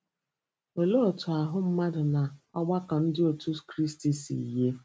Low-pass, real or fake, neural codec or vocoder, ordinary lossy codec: none; real; none; none